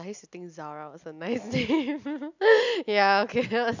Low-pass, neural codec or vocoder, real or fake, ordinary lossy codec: 7.2 kHz; none; real; none